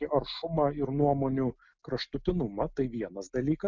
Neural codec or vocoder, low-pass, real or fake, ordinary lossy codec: none; 7.2 kHz; real; Opus, 64 kbps